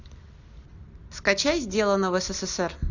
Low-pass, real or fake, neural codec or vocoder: 7.2 kHz; real; none